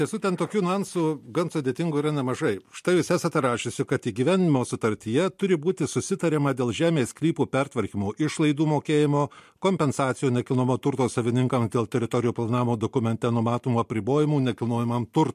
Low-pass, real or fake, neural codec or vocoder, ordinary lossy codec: 14.4 kHz; real; none; MP3, 64 kbps